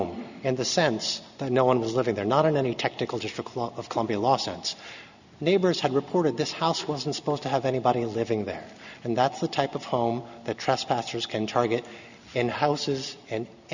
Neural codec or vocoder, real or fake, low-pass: none; real; 7.2 kHz